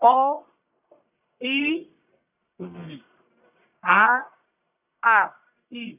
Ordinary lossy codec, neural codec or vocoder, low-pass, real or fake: none; codec, 44.1 kHz, 1.7 kbps, Pupu-Codec; 3.6 kHz; fake